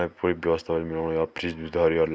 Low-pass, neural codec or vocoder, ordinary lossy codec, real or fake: none; none; none; real